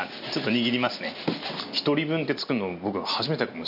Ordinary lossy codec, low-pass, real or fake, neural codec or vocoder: none; 5.4 kHz; real; none